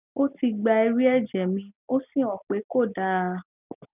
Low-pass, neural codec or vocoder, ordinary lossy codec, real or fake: 3.6 kHz; none; none; real